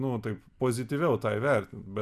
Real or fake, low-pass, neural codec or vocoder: real; 14.4 kHz; none